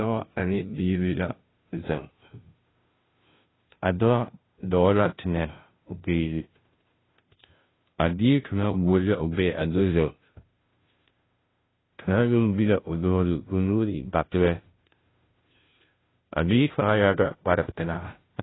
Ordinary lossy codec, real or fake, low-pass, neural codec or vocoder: AAC, 16 kbps; fake; 7.2 kHz; codec, 16 kHz, 0.5 kbps, FunCodec, trained on Chinese and English, 25 frames a second